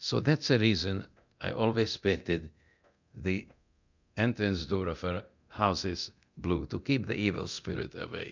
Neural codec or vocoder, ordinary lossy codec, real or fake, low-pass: codec, 24 kHz, 0.9 kbps, DualCodec; MP3, 64 kbps; fake; 7.2 kHz